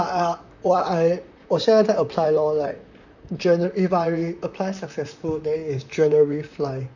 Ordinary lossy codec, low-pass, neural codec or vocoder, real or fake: none; 7.2 kHz; vocoder, 44.1 kHz, 128 mel bands, Pupu-Vocoder; fake